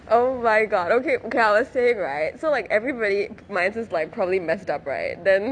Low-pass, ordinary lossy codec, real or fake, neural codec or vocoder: 9.9 kHz; none; real; none